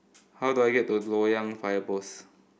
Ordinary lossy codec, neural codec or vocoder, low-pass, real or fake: none; none; none; real